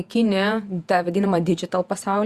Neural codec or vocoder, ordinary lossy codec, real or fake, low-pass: vocoder, 48 kHz, 128 mel bands, Vocos; Opus, 64 kbps; fake; 14.4 kHz